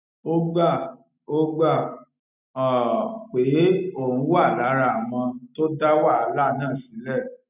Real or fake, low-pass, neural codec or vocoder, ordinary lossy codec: real; 3.6 kHz; none; none